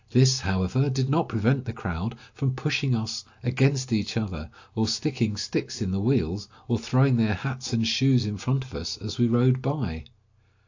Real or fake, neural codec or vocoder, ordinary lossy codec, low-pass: real; none; AAC, 48 kbps; 7.2 kHz